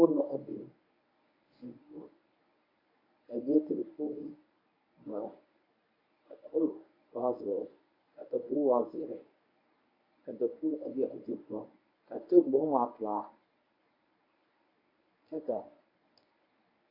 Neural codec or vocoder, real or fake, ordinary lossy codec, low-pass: codec, 24 kHz, 0.9 kbps, WavTokenizer, medium speech release version 1; fake; AAC, 48 kbps; 5.4 kHz